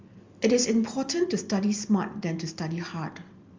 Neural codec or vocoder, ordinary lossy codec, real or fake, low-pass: none; Opus, 32 kbps; real; 7.2 kHz